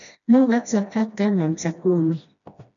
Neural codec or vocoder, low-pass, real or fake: codec, 16 kHz, 1 kbps, FreqCodec, smaller model; 7.2 kHz; fake